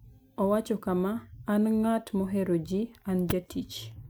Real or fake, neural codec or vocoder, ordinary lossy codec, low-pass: real; none; none; none